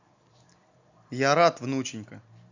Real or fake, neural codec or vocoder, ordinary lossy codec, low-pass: real; none; Opus, 64 kbps; 7.2 kHz